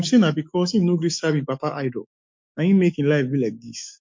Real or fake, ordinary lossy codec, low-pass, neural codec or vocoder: fake; MP3, 48 kbps; 7.2 kHz; autoencoder, 48 kHz, 128 numbers a frame, DAC-VAE, trained on Japanese speech